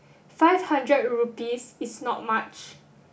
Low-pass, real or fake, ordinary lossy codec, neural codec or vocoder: none; real; none; none